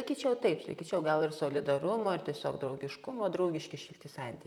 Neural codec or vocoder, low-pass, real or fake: vocoder, 44.1 kHz, 128 mel bands, Pupu-Vocoder; 19.8 kHz; fake